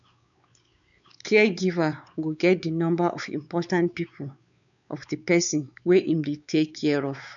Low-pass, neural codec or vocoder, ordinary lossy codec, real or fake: 7.2 kHz; codec, 16 kHz, 4 kbps, X-Codec, WavLM features, trained on Multilingual LibriSpeech; none; fake